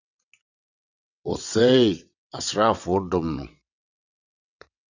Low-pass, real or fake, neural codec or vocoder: 7.2 kHz; fake; vocoder, 22.05 kHz, 80 mel bands, WaveNeXt